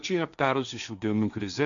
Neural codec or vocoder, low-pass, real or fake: codec, 16 kHz, 1.1 kbps, Voila-Tokenizer; 7.2 kHz; fake